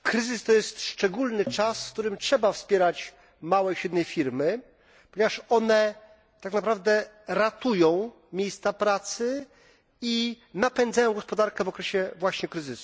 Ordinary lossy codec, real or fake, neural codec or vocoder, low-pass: none; real; none; none